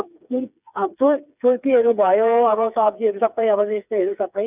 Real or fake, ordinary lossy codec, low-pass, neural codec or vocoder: fake; none; 3.6 kHz; codec, 16 kHz, 4 kbps, FreqCodec, smaller model